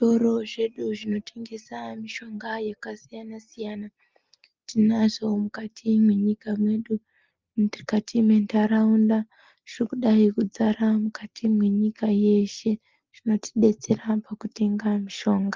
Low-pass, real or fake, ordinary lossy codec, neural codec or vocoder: 7.2 kHz; real; Opus, 32 kbps; none